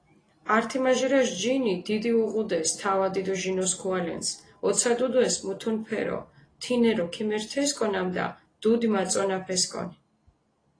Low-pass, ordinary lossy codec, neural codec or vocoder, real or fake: 9.9 kHz; AAC, 32 kbps; none; real